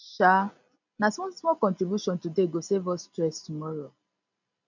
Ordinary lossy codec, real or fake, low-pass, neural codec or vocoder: none; real; 7.2 kHz; none